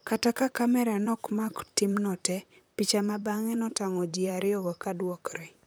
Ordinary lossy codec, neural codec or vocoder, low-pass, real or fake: none; vocoder, 44.1 kHz, 128 mel bands, Pupu-Vocoder; none; fake